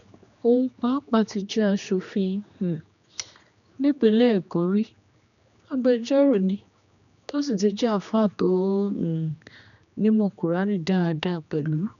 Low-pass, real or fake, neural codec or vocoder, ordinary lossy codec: 7.2 kHz; fake; codec, 16 kHz, 2 kbps, X-Codec, HuBERT features, trained on general audio; none